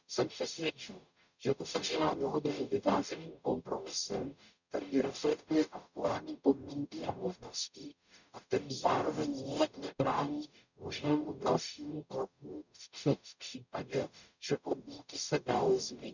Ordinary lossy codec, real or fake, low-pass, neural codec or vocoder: none; fake; 7.2 kHz; codec, 44.1 kHz, 0.9 kbps, DAC